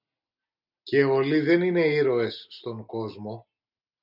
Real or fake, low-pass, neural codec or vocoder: real; 5.4 kHz; none